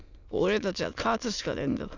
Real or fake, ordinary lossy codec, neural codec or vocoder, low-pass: fake; none; autoencoder, 22.05 kHz, a latent of 192 numbers a frame, VITS, trained on many speakers; 7.2 kHz